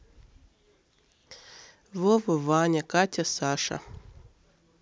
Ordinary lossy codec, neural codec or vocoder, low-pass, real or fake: none; none; none; real